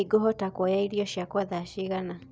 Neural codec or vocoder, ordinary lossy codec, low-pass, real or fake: none; none; none; real